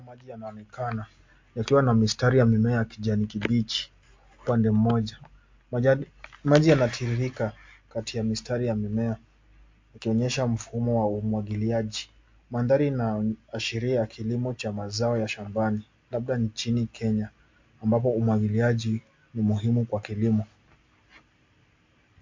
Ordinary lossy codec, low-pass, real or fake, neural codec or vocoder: MP3, 48 kbps; 7.2 kHz; real; none